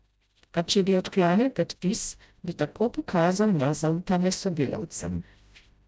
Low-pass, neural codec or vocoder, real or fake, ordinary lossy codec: none; codec, 16 kHz, 0.5 kbps, FreqCodec, smaller model; fake; none